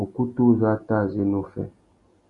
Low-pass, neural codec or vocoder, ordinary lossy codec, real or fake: 10.8 kHz; none; MP3, 48 kbps; real